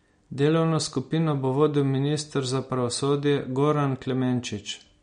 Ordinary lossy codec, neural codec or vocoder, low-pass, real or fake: MP3, 48 kbps; none; 9.9 kHz; real